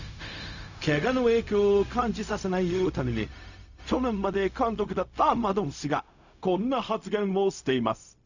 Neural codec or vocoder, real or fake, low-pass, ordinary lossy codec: codec, 16 kHz, 0.4 kbps, LongCat-Audio-Codec; fake; 7.2 kHz; none